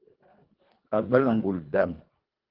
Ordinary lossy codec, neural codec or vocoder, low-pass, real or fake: Opus, 32 kbps; codec, 24 kHz, 1.5 kbps, HILCodec; 5.4 kHz; fake